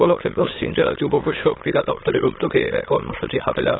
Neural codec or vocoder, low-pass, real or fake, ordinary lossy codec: autoencoder, 22.05 kHz, a latent of 192 numbers a frame, VITS, trained on many speakers; 7.2 kHz; fake; AAC, 16 kbps